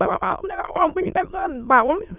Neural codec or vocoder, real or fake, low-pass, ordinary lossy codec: autoencoder, 22.05 kHz, a latent of 192 numbers a frame, VITS, trained on many speakers; fake; 3.6 kHz; none